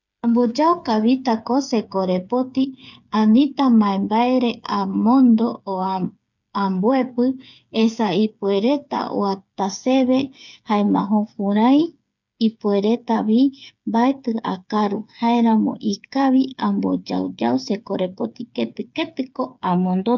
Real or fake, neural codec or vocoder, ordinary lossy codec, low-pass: fake; codec, 16 kHz, 8 kbps, FreqCodec, smaller model; none; 7.2 kHz